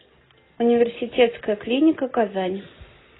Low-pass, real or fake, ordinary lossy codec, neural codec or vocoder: 7.2 kHz; real; AAC, 16 kbps; none